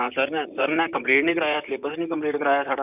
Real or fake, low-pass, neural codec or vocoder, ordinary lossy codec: fake; 3.6 kHz; vocoder, 44.1 kHz, 128 mel bands, Pupu-Vocoder; none